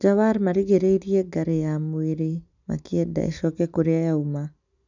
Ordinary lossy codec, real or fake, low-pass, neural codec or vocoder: none; real; 7.2 kHz; none